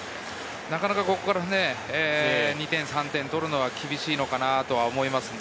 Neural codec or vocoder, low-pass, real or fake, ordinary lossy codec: none; none; real; none